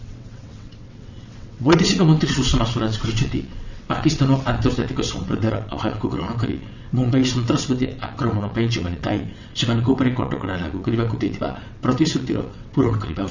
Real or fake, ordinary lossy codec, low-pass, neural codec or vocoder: fake; none; 7.2 kHz; vocoder, 22.05 kHz, 80 mel bands, WaveNeXt